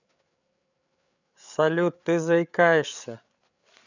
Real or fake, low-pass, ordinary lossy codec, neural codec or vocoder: fake; 7.2 kHz; none; codec, 16 kHz, 8 kbps, FreqCodec, larger model